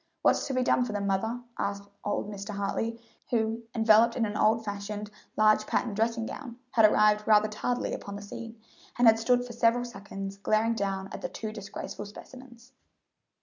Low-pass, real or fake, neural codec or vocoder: 7.2 kHz; real; none